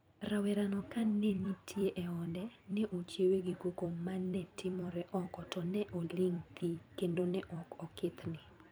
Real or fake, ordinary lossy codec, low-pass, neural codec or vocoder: fake; none; none; vocoder, 44.1 kHz, 128 mel bands every 256 samples, BigVGAN v2